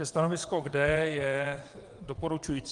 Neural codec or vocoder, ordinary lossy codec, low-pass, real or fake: vocoder, 22.05 kHz, 80 mel bands, WaveNeXt; Opus, 24 kbps; 9.9 kHz; fake